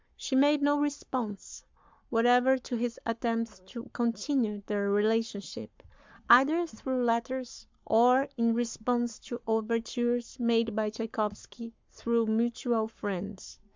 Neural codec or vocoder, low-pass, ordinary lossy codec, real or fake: codec, 44.1 kHz, 7.8 kbps, Pupu-Codec; 7.2 kHz; MP3, 64 kbps; fake